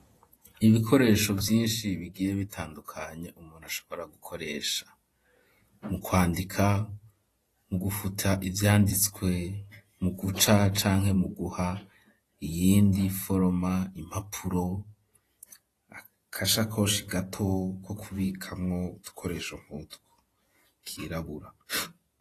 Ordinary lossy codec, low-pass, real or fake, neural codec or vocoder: AAC, 48 kbps; 14.4 kHz; fake; vocoder, 48 kHz, 128 mel bands, Vocos